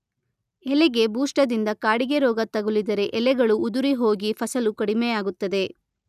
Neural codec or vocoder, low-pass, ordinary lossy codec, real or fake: none; 14.4 kHz; none; real